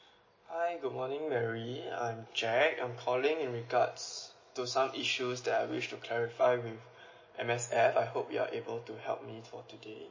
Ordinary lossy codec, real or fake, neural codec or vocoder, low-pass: MP3, 32 kbps; real; none; 7.2 kHz